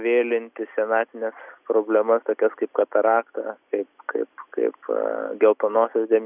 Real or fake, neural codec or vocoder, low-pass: real; none; 3.6 kHz